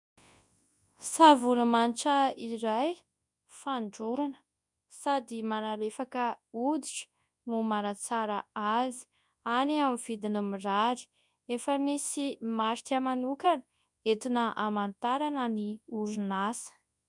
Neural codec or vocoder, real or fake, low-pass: codec, 24 kHz, 0.9 kbps, WavTokenizer, large speech release; fake; 10.8 kHz